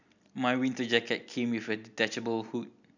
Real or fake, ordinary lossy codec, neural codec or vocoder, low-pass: real; none; none; 7.2 kHz